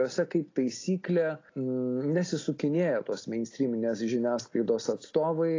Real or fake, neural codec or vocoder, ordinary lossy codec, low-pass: real; none; AAC, 32 kbps; 7.2 kHz